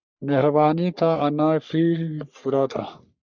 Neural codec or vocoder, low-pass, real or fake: codec, 44.1 kHz, 3.4 kbps, Pupu-Codec; 7.2 kHz; fake